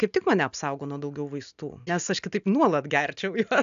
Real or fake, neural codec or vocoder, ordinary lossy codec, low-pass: real; none; AAC, 96 kbps; 7.2 kHz